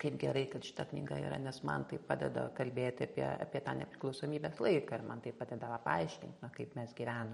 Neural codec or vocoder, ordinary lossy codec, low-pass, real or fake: vocoder, 44.1 kHz, 128 mel bands every 512 samples, BigVGAN v2; MP3, 48 kbps; 19.8 kHz; fake